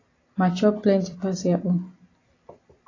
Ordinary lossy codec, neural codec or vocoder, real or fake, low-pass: AAC, 32 kbps; none; real; 7.2 kHz